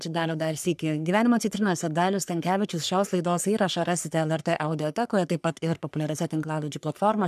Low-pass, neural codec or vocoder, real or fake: 14.4 kHz; codec, 44.1 kHz, 3.4 kbps, Pupu-Codec; fake